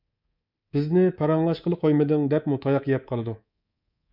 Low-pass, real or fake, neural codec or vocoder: 5.4 kHz; fake; codec, 24 kHz, 3.1 kbps, DualCodec